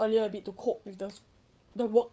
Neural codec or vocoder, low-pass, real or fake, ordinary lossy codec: codec, 16 kHz, 8 kbps, FreqCodec, larger model; none; fake; none